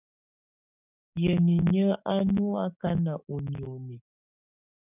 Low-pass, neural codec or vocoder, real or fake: 3.6 kHz; codec, 16 kHz, 6 kbps, DAC; fake